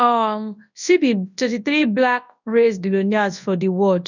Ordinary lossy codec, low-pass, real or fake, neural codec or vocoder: none; 7.2 kHz; fake; codec, 24 kHz, 0.9 kbps, WavTokenizer, large speech release